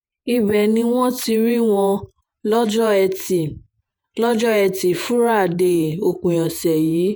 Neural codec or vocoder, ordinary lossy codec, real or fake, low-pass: vocoder, 48 kHz, 128 mel bands, Vocos; none; fake; none